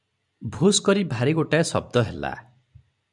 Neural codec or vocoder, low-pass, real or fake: vocoder, 44.1 kHz, 128 mel bands every 256 samples, BigVGAN v2; 10.8 kHz; fake